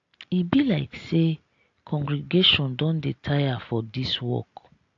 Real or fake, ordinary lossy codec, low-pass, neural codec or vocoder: real; AAC, 48 kbps; 7.2 kHz; none